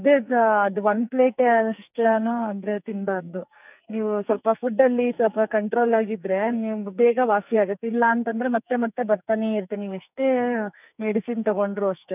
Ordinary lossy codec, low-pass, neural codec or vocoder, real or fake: none; 3.6 kHz; codec, 44.1 kHz, 2.6 kbps, SNAC; fake